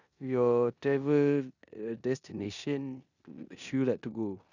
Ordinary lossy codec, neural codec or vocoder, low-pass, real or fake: none; codec, 16 kHz in and 24 kHz out, 0.9 kbps, LongCat-Audio-Codec, four codebook decoder; 7.2 kHz; fake